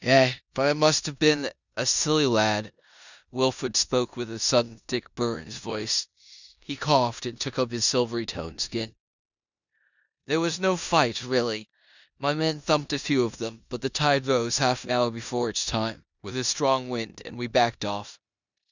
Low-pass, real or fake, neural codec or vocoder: 7.2 kHz; fake; codec, 16 kHz in and 24 kHz out, 0.9 kbps, LongCat-Audio-Codec, four codebook decoder